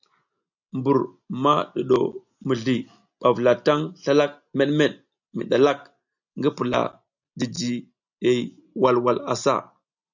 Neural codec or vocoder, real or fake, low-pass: none; real; 7.2 kHz